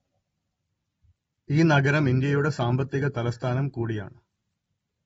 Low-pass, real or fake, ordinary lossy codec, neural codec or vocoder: 19.8 kHz; real; AAC, 24 kbps; none